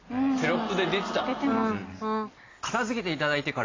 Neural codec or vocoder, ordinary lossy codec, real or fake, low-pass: none; AAC, 32 kbps; real; 7.2 kHz